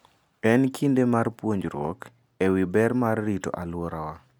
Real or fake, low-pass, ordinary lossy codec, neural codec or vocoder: real; none; none; none